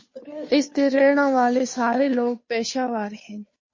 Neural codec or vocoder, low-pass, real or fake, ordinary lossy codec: codec, 16 kHz, 2 kbps, FunCodec, trained on Chinese and English, 25 frames a second; 7.2 kHz; fake; MP3, 32 kbps